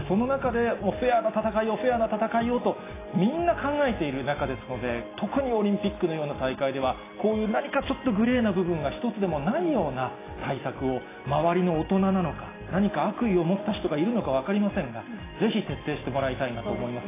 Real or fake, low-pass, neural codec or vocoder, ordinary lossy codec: real; 3.6 kHz; none; AAC, 16 kbps